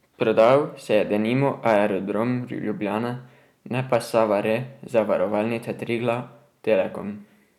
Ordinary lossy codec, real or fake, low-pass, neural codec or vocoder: none; real; 19.8 kHz; none